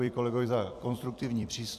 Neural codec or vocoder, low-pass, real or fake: vocoder, 44.1 kHz, 128 mel bands every 256 samples, BigVGAN v2; 14.4 kHz; fake